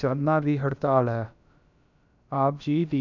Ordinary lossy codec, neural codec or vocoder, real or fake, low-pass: none; codec, 16 kHz, about 1 kbps, DyCAST, with the encoder's durations; fake; 7.2 kHz